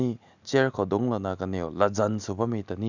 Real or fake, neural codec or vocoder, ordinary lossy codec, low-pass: real; none; none; 7.2 kHz